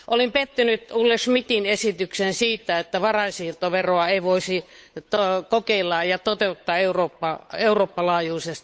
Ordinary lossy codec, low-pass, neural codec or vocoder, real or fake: none; none; codec, 16 kHz, 8 kbps, FunCodec, trained on Chinese and English, 25 frames a second; fake